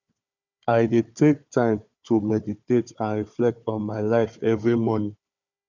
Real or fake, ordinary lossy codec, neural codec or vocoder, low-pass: fake; none; codec, 16 kHz, 4 kbps, FunCodec, trained on Chinese and English, 50 frames a second; 7.2 kHz